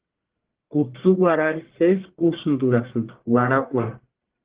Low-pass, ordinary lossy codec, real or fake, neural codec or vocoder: 3.6 kHz; Opus, 16 kbps; fake; codec, 44.1 kHz, 1.7 kbps, Pupu-Codec